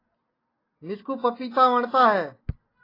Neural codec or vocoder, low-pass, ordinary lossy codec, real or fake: none; 5.4 kHz; AAC, 24 kbps; real